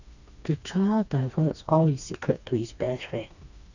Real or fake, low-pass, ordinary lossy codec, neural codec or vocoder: fake; 7.2 kHz; none; codec, 16 kHz, 2 kbps, FreqCodec, smaller model